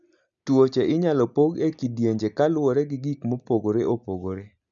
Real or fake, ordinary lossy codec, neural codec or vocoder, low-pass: real; none; none; 7.2 kHz